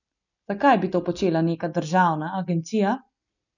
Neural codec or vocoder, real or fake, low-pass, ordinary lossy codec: none; real; 7.2 kHz; none